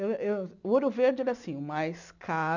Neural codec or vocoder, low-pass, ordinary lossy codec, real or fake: none; 7.2 kHz; none; real